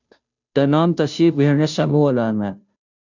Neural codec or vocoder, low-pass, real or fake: codec, 16 kHz, 0.5 kbps, FunCodec, trained on Chinese and English, 25 frames a second; 7.2 kHz; fake